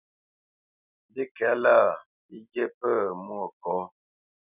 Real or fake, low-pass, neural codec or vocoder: real; 3.6 kHz; none